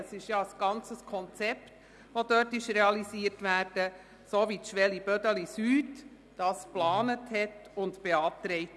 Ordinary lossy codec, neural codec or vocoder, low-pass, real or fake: none; none; none; real